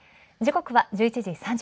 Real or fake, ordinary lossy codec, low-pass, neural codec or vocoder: real; none; none; none